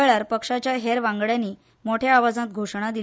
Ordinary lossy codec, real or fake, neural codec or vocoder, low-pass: none; real; none; none